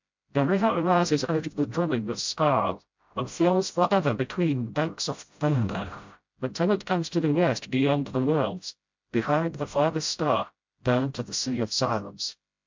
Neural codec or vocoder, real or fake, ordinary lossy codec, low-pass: codec, 16 kHz, 0.5 kbps, FreqCodec, smaller model; fake; MP3, 64 kbps; 7.2 kHz